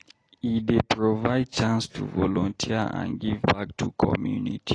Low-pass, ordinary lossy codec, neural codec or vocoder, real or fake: 9.9 kHz; AAC, 32 kbps; none; real